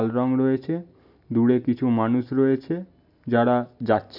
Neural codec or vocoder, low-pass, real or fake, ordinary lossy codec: none; 5.4 kHz; real; none